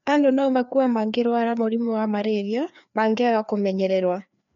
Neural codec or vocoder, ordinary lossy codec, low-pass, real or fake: codec, 16 kHz, 2 kbps, FreqCodec, larger model; none; 7.2 kHz; fake